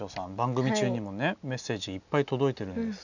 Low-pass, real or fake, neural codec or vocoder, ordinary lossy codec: 7.2 kHz; real; none; none